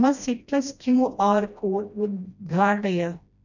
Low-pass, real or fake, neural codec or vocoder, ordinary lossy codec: 7.2 kHz; fake; codec, 16 kHz, 1 kbps, FreqCodec, smaller model; none